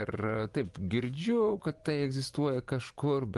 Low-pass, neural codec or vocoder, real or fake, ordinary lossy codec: 10.8 kHz; vocoder, 24 kHz, 100 mel bands, Vocos; fake; Opus, 24 kbps